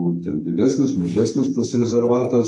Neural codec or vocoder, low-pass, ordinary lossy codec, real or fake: codec, 32 kHz, 1.9 kbps, SNAC; 10.8 kHz; AAC, 48 kbps; fake